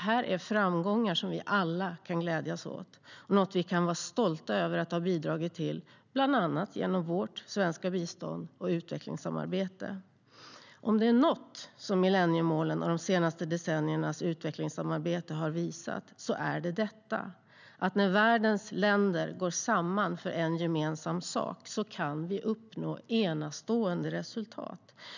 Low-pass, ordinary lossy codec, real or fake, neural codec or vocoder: 7.2 kHz; none; real; none